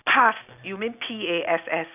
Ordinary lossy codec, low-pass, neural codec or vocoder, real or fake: none; 3.6 kHz; none; real